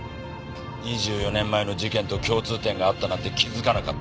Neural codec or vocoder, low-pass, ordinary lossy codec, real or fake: none; none; none; real